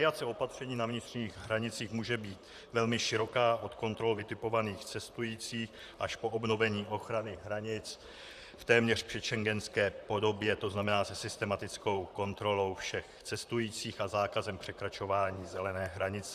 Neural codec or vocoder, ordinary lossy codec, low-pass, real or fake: vocoder, 44.1 kHz, 128 mel bands, Pupu-Vocoder; Opus, 64 kbps; 14.4 kHz; fake